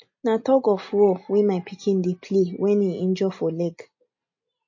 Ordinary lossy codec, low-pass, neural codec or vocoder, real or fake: MP3, 32 kbps; 7.2 kHz; none; real